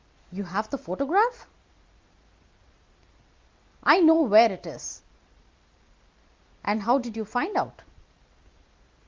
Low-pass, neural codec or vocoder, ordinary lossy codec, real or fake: 7.2 kHz; none; Opus, 32 kbps; real